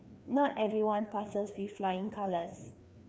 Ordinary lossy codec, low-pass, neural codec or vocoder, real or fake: none; none; codec, 16 kHz, 2 kbps, FreqCodec, larger model; fake